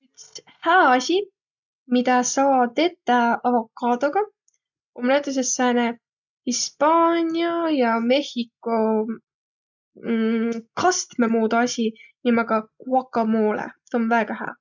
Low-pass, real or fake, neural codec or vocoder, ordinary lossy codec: 7.2 kHz; real; none; none